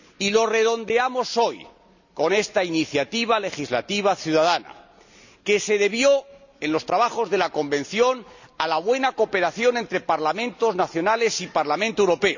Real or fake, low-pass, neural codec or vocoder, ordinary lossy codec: real; 7.2 kHz; none; none